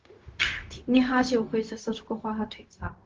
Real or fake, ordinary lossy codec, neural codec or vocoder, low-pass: fake; Opus, 16 kbps; codec, 16 kHz, 0.4 kbps, LongCat-Audio-Codec; 7.2 kHz